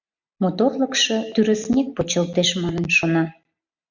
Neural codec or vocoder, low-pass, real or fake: none; 7.2 kHz; real